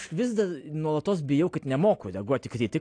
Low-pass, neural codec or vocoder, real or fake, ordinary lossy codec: 9.9 kHz; none; real; AAC, 48 kbps